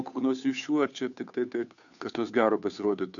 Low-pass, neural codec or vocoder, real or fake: 7.2 kHz; codec, 16 kHz, 2 kbps, FunCodec, trained on Chinese and English, 25 frames a second; fake